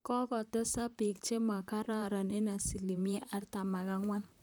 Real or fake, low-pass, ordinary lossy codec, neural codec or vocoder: fake; none; none; vocoder, 44.1 kHz, 128 mel bands, Pupu-Vocoder